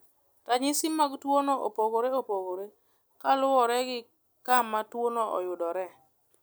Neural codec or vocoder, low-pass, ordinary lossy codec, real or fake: none; none; none; real